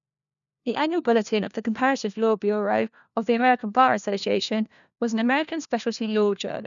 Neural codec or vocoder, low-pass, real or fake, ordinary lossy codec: codec, 16 kHz, 1 kbps, FunCodec, trained on LibriTTS, 50 frames a second; 7.2 kHz; fake; none